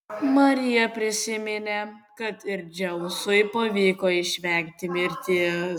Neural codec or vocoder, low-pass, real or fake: none; 19.8 kHz; real